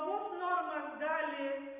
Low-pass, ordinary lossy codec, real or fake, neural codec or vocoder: 3.6 kHz; Opus, 64 kbps; real; none